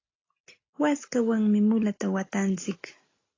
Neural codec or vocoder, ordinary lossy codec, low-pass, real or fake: none; AAC, 32 kbps; 7.2 kHz; real